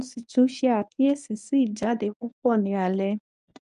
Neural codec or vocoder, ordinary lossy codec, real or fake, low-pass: codec, 24 kHz, 0.9 kbps, WavTokenizer, medium speech release version 1; none; fake; 10.8 kHz